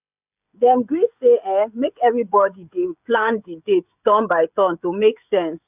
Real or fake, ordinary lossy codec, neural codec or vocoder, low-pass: fake; none; codec, 16 kHz, 16 kbps, FreqCodec, smaller model; 3.6 kHz